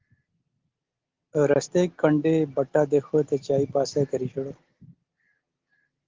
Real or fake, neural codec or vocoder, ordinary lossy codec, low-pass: real; none; Opus, 16 kbps; 7.2 kHz